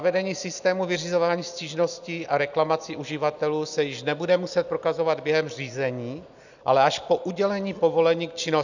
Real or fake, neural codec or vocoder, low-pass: real; none; 7.2 kHz